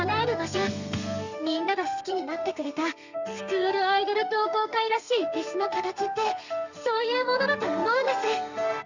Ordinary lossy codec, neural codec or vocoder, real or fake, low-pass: Opus, 64 kbps; codec, 32 kHz, 1.9 kbps, SNAC; fake; 7.2 kHz